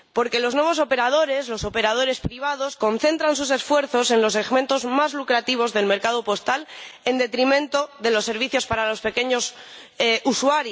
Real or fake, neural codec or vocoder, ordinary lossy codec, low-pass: real; none; none; none